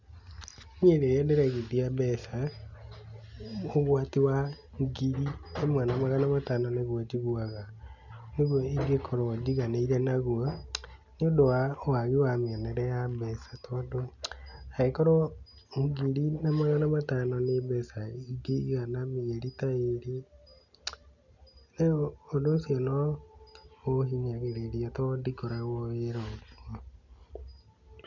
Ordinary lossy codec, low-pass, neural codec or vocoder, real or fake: none; 7.2 kHz; none; real